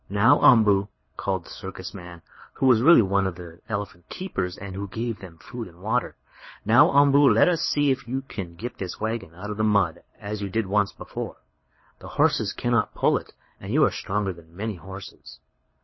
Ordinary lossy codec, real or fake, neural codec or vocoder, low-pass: MP3, 24 kbps; fake; codec, 24 kHz, 6 kbps, HILCodec; 7.2 kHz